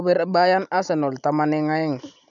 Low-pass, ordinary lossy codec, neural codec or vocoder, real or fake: 7.2 kHz; none; none; real